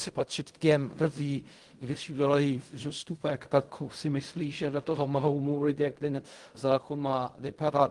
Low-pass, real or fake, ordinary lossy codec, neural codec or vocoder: 10.8 kHz; fake; Opus, 24 kbps; codec, 16 kHz in and 24 kHz out, 0.4 kbps, LongCat-Audio-Codec, fine tuned four codebook decoder